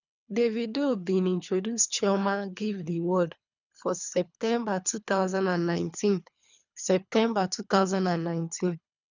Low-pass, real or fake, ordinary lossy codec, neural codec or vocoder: 7.2 kHz; fake; none; codec, 24 kHz, 3 kbps, HILCodec